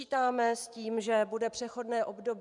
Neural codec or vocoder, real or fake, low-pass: vocoder, 44.1 kHz, 128 mel bands every 512 samples, BigVGAN v2; fake; 10.8 kHz